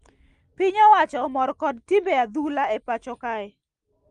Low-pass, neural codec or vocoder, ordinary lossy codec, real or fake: 9.9 kHz; none; Opus, 24 kbps; real